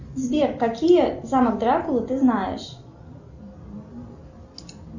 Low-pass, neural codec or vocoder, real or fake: 7.2 kHz; none; real